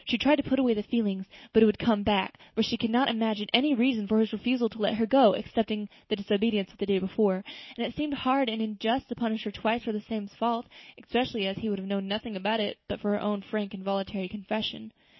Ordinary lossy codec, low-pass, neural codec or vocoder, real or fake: MP3, 24 kbps; 7.2 kHz; none; real